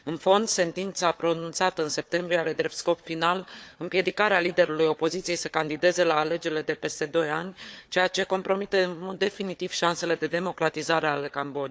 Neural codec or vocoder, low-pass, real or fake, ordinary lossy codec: codec, 16 kHz, 4 kbps, FunCodec, trained on Chinese and English, 50 frames a second; none; fake; none